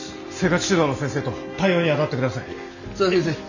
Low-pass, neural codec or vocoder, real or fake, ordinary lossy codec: 7.2 kHz; none; real; none